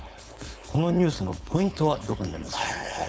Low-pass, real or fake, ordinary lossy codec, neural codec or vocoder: none; fake; none; codec, 16 kHz, 4.8 kbps, FACodec